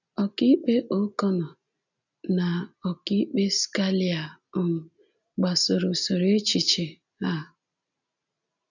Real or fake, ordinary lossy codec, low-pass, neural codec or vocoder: real; none; 7.2 kHz; none